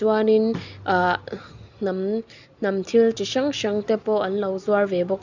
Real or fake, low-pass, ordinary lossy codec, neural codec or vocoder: real; 7.2 kHz; none; none